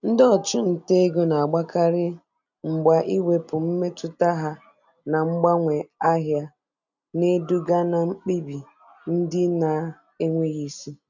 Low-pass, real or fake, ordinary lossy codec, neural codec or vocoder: 7.2 kHz; real; none; none